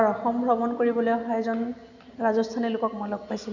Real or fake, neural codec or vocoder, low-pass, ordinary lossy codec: real; none; 7.2 kHz; none